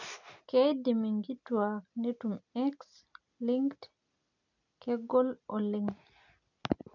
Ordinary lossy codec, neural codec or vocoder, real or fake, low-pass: none; none; real; 7.2 kHz